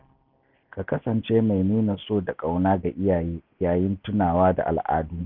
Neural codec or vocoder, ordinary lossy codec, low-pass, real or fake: none; none; 5.4 kHz; real